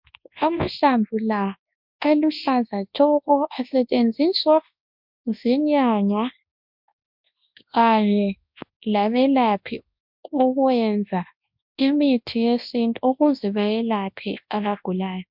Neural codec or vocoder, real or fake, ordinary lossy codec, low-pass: codec, 24 kHz, 0.9 kbps, WavTokenizer, large speech release; fake; MP3, 48 kbps; 5.4 kHz